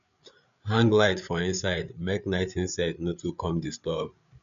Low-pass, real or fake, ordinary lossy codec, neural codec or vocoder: 7.2 kHz; fake; MP3, 96 kbps; codec, 16 kHz, 8 kbps, FreqCodec, larger model